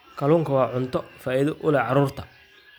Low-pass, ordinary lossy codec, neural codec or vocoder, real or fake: none; none; none; real